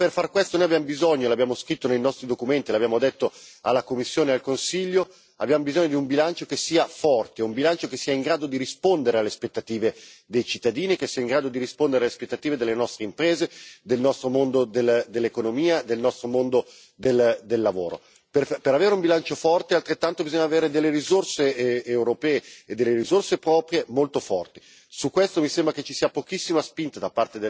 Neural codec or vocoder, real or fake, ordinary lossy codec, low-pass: none; real; none; none